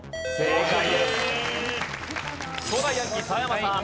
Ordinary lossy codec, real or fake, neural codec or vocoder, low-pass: none; real; none; none